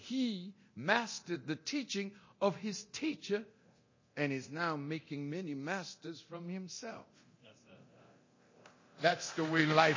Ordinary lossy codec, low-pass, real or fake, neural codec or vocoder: MP3, 32 kbps; 7.2 kHz; fake; codec, 24 kHz, 0.9 kbps, DualCodec